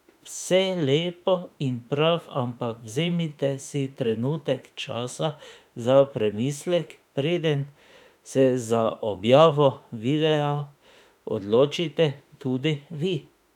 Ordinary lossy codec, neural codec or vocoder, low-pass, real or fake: none; autoencoder, 48 kHz, 32 numbers a frame, DAC-VAE, trained on Japanese speech; 19.8 kHz; fake